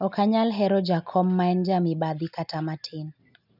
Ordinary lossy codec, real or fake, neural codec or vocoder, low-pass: none; real; none; 5.4 kHz